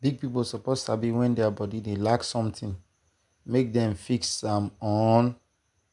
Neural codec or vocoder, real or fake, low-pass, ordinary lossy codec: none; real; 10.8 kHz; none